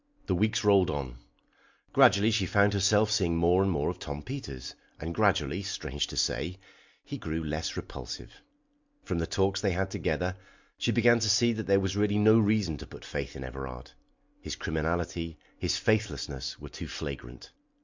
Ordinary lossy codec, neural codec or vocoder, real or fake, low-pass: MP3, 64 kbps; none; real; 7.2 kHz